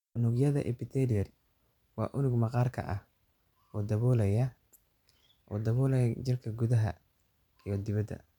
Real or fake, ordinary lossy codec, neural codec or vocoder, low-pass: fake; none; vocoder, 44.1 kHz, 128 mel bands every 512 samples, BigVGAN v2; 19.8 kHz